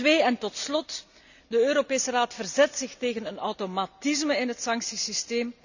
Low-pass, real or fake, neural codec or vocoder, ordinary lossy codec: 7.2 kHz; real; none; none